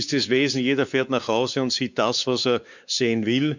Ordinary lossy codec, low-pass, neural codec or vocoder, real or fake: none; 7.2 kHz; codec, 16 kHz, 4 kbps, X-Codec, WavLM features, trained on Multilingual LibriSpeech; fake